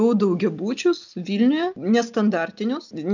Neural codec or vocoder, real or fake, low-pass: none; real; 7.2 kHz